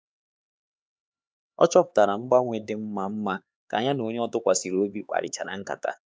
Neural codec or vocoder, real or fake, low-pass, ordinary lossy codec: codec, 16 kHz, 4 kbps, X-Codec, HuBERT features, trained on LibriSpeech; fake; none; none